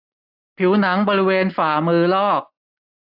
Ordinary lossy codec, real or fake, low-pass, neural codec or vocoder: AAC, 48 kbps; real; 5.4 kHz; none